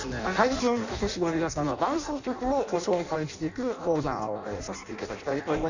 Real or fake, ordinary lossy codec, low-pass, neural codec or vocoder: fake; none; 7.2 kHz; codec, 16 kHz in and 24 kHz out, 0.6 kbps, FireRedTTS-2 codec